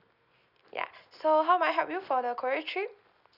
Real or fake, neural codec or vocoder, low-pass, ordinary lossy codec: real; none; 5.4 kHz; Opus, 64 kbps